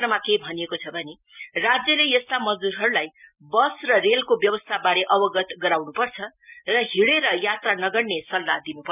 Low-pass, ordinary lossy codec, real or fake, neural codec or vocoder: 3.6 kHz; none; real; none